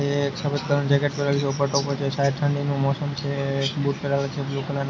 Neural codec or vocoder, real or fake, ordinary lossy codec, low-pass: none; real; none; none